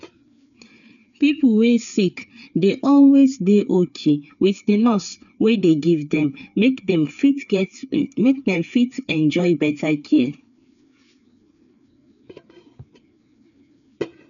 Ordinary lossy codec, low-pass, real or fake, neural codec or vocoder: none; 7.2 kHz; fake; codec, 16 kHz, 4 kbps, FreqCodec, larger model